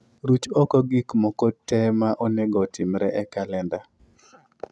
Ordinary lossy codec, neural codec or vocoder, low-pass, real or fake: none; none; none; real